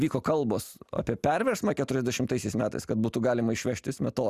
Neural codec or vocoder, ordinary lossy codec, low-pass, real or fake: none; Opus, 64 kbps; 14.4 kHz; real